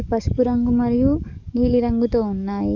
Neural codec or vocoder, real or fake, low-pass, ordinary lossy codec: codec, 44.1 kHz, 7.8 kbps, DAC; fake; 7.2 kHz; none